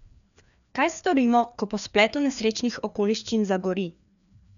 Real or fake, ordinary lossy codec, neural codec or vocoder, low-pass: fake; none; codec, 16 kHz, 2 kbps, FreqCodec, larger model; 7.2 kHz